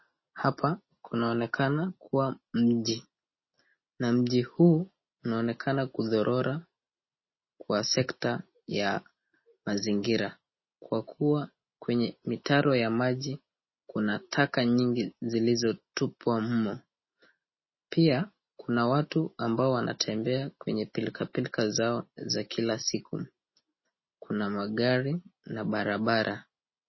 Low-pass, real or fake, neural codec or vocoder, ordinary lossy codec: 7.2 kHz; real; none; MP3, 24 kbps